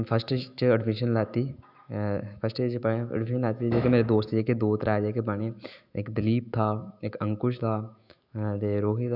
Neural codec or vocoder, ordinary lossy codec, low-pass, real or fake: autoencoder, 48 kHz, 128 numbers a frame, DAC-VAE, trained on Japanese speech; none; 5.4 kHz; fake